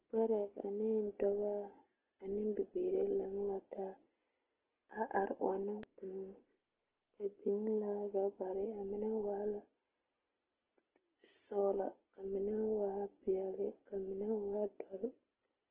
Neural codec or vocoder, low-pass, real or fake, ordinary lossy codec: none; 3.6 kHz; real; Opus, 16 kbps